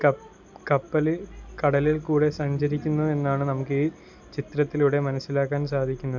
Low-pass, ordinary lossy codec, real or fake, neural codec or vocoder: 7.2 kHz; none; real; none